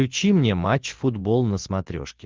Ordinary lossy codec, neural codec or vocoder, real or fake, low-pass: Opus, 16 kbps; none; real; 7.2 kHz